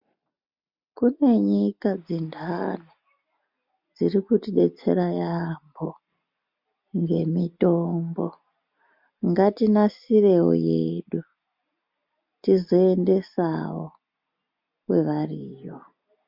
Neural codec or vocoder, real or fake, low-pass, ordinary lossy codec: none; real; 5.4 kHz; MP3, 48 kbps